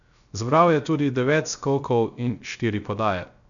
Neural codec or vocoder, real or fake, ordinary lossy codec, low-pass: codec, 16 kHz, 0.3 kbps, FocalCodec; fake; none; 7.2 kHz